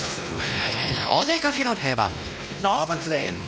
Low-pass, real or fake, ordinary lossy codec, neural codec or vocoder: none; fake; none; codec, 16 kHz, 1 kbps, X-Codec, WavLM features, trained on Multilingual LibriSpeech